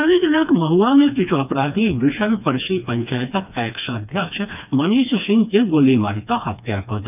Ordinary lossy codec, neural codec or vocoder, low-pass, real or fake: none; codec, 16 kHz, 2 kbps, FreqCodec, smaller model; 3.6 kHz; fake